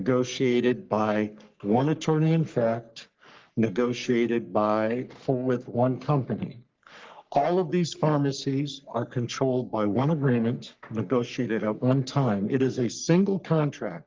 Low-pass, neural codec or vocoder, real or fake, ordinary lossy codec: 7.2 kHz; codec, 44.1 kHz, 3.4 kbps, Pupu-Codec; fake; Opus, 24 kbps